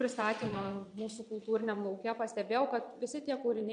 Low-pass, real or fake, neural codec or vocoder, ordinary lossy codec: 9.9 kHz; fake; vocoder, 22.05 kHz, 80 mel bands, Vocos; MP3, 64 kbps